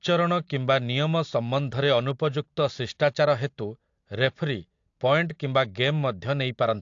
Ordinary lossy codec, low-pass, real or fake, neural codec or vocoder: AAC, 48 kbps; 7.2 kHz; real; none